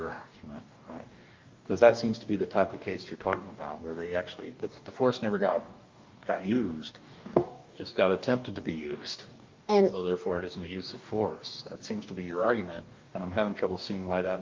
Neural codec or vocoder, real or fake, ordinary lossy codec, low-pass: codec, 44.1 kHz, 2.6 kbps, DAC; fake; Opus, 24 kbps; 7.2 kHz